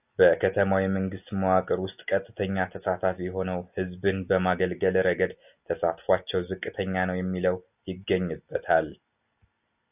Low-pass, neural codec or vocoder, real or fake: 3.6 kHz; none; real